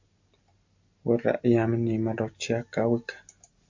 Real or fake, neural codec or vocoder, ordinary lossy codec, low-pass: real; none; MP3, 48 kbps; 7.2 kHz